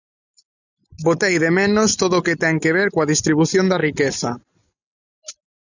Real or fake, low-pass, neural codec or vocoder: real; 7.2 kHz; none